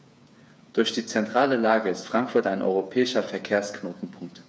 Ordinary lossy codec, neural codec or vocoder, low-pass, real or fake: none; codec, 16 kHz, 8 kbps, FreqCodec, smaller model; none; fake